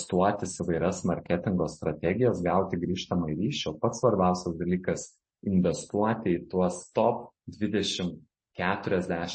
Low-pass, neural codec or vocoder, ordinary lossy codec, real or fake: 10.8 kHz; none; MP3, 32 kbps; real